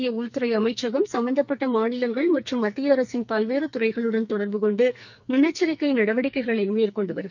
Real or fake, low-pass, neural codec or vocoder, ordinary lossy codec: fake; 7.2 kHz; codec, 44.1 kHz, 2.6 kbps, SNAC; none